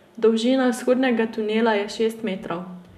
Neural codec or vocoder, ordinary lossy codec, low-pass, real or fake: none; none; 14.4 kHz; real